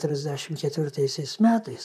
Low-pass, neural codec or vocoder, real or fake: 14.4 kHz; vocoder, 44.1 kHz, 128 mel bands, Pupu-Vocoder; fake